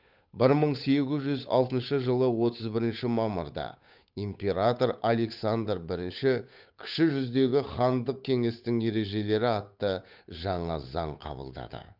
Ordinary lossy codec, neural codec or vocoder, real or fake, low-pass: none; codec, 16 kHz, 8 kbps, FunCodec, trained on Chinese and English, 25 frames a second; fake; 5.4 kHz